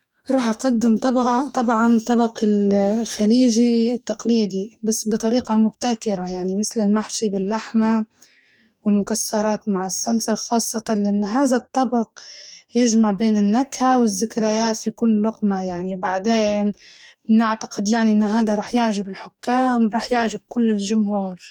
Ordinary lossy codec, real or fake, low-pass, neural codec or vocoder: none; fake; 19.8 kHz; codec, 44.1 kHz, 2.6 kbps, DAC